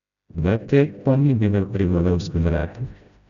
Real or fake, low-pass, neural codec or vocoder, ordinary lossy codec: fake; 7.2 kHz; codec, 16 kHz, 0.5 kbps, FreqCodec, smaller model; none